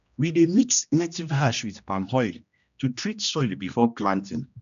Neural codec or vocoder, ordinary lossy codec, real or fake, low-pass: codec, 16 kHz, 1 kbps, X-Codec, HuBERT features, trained on general audio; none; fake; 7.2 kHz